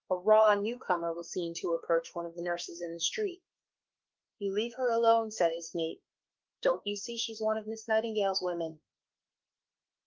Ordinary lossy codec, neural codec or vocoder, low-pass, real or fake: Opus, 32 kbps; autoencoder, 48 kHz, 32 numbers a frame, DAC-VAE, trained on Japanese speech; 7.2 kHz; fake